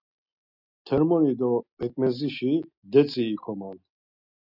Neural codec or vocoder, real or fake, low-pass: none; real; 5.4 kHz